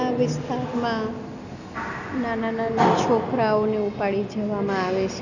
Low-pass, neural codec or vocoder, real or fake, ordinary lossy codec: 7.2 kHz; none; real; none